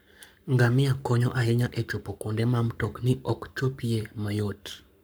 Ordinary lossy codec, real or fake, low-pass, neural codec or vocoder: none; fake; none; codec, 44.1 kHz, 7.8 kbps, Pupu-Codec